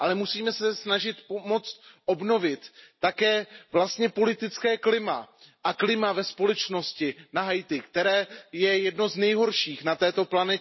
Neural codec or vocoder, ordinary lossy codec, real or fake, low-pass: none; MP3, 24 kbps; real; 7.2 kHz